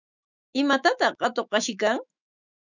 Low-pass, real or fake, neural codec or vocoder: 7.2 kHz; fake; autoencoder, 48 kHz, 128 numbers a frame, DAC-VAE, trained on Japanese speech